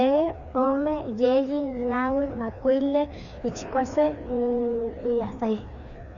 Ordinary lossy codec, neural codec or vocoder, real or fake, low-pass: none; codec, 16 kHz, 2 kbps, FreqCodec, larger model; fake; 7.2 kHz